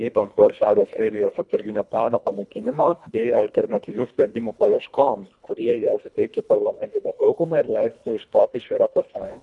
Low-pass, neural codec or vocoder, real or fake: 10.8 kHz; codec, 24 kHz, 1.5 kbps, HILCodec; fake